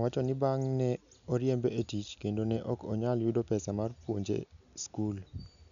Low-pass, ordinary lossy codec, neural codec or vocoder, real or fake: 7.2 kHz; none; none; real